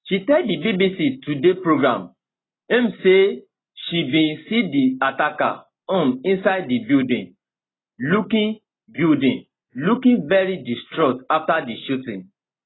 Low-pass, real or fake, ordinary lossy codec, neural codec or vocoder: 7.2 kHz; real; AAC, 16 kbps; none